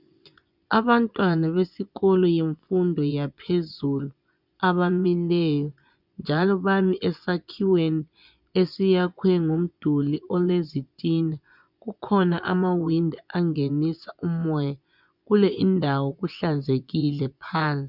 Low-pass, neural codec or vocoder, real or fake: 5.4 kHz; vocoder, 24 kHz, 100 mel bands, Vocos; fake